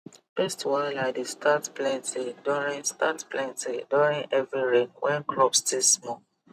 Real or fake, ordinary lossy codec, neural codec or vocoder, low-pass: fake; none; vocoder, 44.1 kHz, 128 mel bands every 256 samples, BigVGAN v2; 14.4 kHz